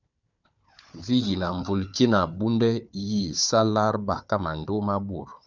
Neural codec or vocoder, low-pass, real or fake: codec, 16 kHz, 4 kbps, FunCodec, trained on Chinese and English, 50 frames a second; 7.2 kHz; fake